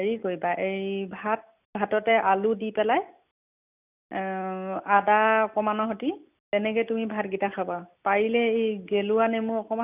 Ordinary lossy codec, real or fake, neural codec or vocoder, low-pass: none; real; none; 3.6 kHz